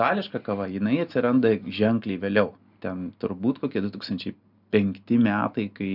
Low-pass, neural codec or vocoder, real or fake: 5.4 kHz; none; real